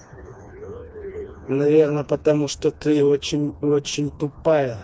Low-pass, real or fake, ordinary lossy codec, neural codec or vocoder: none; fake; none; codec, 16 kHz, 2 kbps, FreqCodec, smaller model